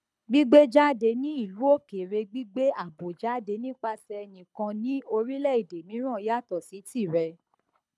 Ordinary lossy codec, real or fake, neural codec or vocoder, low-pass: none; fake; codec, 24 kHz, 6 kbps, HILCodec; none